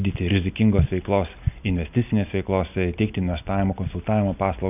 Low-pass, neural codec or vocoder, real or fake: 3.6 kHz; none; real